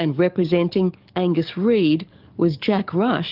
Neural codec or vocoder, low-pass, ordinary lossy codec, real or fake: codec, 44.1 kHz, 7.8 kbps, DAC; 5.4 kHz; Opus, 32 kbps; fake